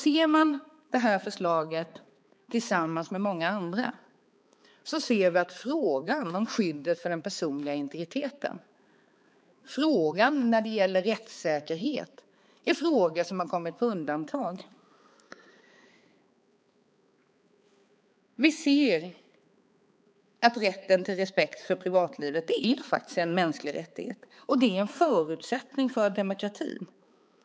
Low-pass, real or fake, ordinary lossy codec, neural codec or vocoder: none; fake; none; codec, 16 kHz, 4 kbps, X-Codec, HuBERT features, trained on balanced general audio